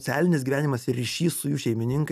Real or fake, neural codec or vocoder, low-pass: real; none; 14.4 kHz